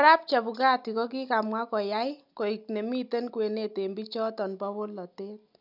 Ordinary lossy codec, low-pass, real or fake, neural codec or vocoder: none; 5.4 kHz; real; none